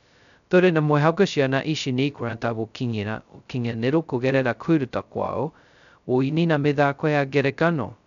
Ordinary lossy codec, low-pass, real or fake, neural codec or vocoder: none; 7.2 kHz; fake; codec, 16 kHz, 0.2 kbps, FocalCodec